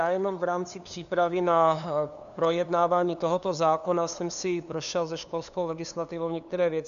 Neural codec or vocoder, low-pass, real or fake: codec, 16 kHz, 2 kbps, FunCodec, trained on LibriTTS, 25 frames a second; 7.2 kHz; fake